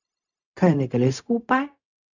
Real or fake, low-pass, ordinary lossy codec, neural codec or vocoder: fake; 7.2 kHz; none; codec, 16 kHz, 0.4 kbps, LongCat-Audio-Codec